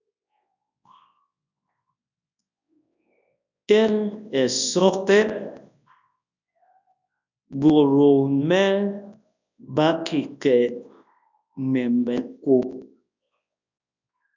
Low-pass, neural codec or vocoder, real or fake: 7.2 kHz; codec, 24 kHz, 0.9 kbps, WavTokenizer, large speech release; fake